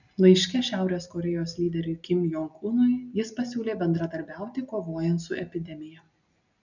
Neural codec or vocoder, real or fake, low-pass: none; real; 7.2 kHz